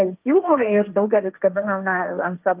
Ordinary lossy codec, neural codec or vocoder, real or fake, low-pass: Opus, 24 kbps; codec, 16 kHz, 1.1 kbps, Voila-Tokenizer; fake; 3.6 kHz